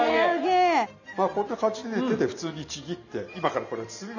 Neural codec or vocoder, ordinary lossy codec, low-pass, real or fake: none; none; 7.2 kHz; real